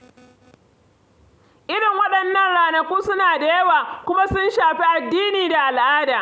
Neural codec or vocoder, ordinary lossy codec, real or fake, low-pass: none; none; real; none